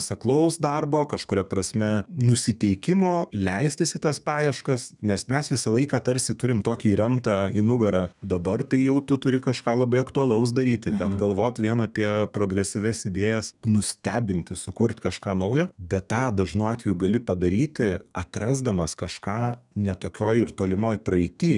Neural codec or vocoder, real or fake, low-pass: codec, 32 kHz, 1.9 kbps, SNAC; fake; 10.8 kHz